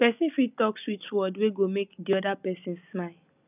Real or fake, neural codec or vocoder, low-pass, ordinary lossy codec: real; none; 3.6 kHz; none